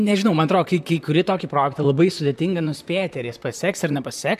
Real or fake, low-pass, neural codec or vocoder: fake; 14.4 kHz; vocoder, 44.1 kHz, 128 mel bands, Pupu-Vocoder